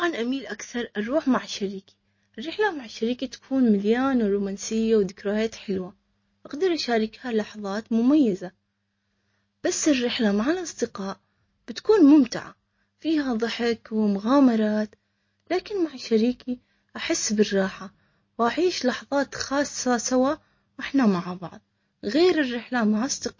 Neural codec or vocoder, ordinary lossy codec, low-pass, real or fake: none; MP3, 32 kbps; 7.2 kHz; real